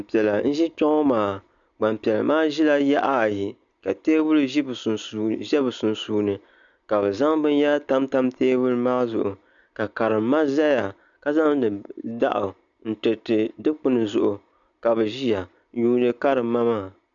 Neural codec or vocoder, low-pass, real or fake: none; 7.2 kHz; real